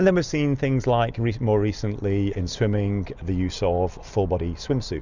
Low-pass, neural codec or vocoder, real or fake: 7.2 kHz; none; real